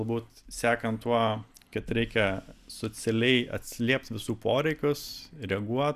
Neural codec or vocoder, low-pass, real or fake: none; 14.4 kHz; real